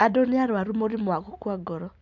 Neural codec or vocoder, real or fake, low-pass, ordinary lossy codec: none; real; 7.2 kHz; none